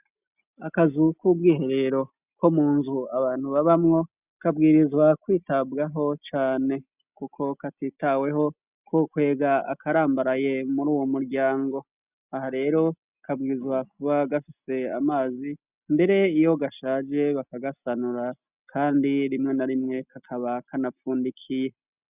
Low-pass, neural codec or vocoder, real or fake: 3.6 kHz; none; real